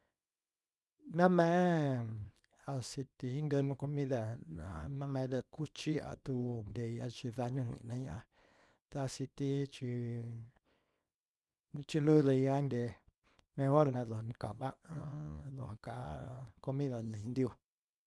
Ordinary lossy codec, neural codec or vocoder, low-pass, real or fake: none; codec, 24 kHz, 0.9 kbps, WavTokenizer, small release; none; fake